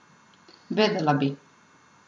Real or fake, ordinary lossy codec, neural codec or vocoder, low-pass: real; MP3, 64 kbps; none; 9.9 kHz